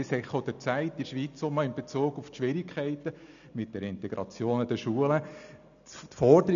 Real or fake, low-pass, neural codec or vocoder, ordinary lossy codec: real; 7.2 kHz; none; none